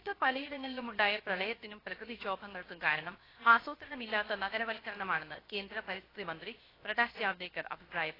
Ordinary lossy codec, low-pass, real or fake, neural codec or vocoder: AAC, 24 kbps; 5.4 kHz; fake; codec, 16 kHz, 0.7 kbps, FocalCodec